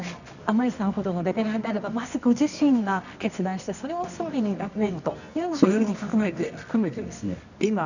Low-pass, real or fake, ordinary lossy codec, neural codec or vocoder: 7.2 kHz; fake; none; codec, 24 kHz, 0.9 kbps, WavTokenizer, medium music audio release